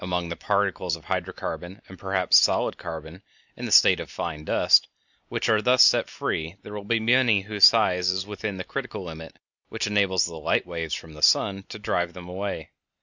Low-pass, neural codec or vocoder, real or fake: 7.2 kHz; none; real